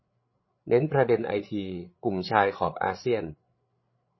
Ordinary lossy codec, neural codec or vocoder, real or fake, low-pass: MP3, 24 kbps; codec, 16 kHz, 8 kbps, FreqCodec, larger model; fake; 7.2 kHz